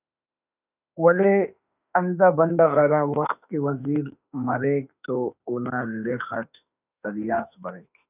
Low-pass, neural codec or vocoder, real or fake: 3.6 kHz; autoencoder, 48 kHz, 32 numbers a frame, DAC-VAE, trained on Japanese speech; fake